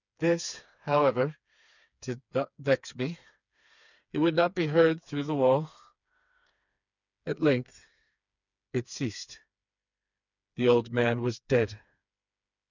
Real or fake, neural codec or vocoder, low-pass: fake; codec, 16 kHz, 4 kbps, FreqCodec, smaller model; 7.2 kHz